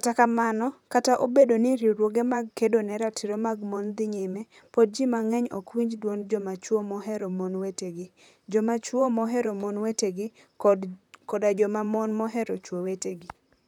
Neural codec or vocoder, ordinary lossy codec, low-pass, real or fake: vocoder, 44.1 kHz, 128 mel bands, Pupu-Vocoder; none; 19.8 kHz; fake